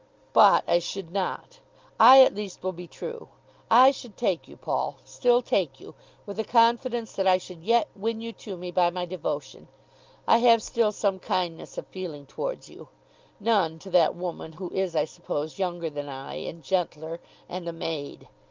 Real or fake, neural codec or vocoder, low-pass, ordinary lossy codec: real; none; 7.2 kHz; Opus, 32 kbps